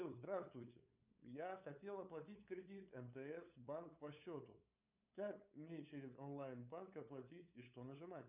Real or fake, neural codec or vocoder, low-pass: fake; codec, 16 kHz, 8 kbps, FunCodec, trained on LibriTTS, 25 frames a second; 3.6 kHz